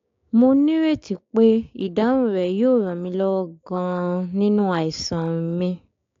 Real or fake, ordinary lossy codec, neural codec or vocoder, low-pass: fake; AAC, 48 kbps; codec, 16 kHz, 6 kbps, DAC; 7.2 kHz